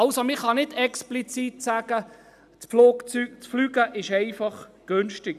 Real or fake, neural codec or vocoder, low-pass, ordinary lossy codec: real; none; 14.4 kHz; none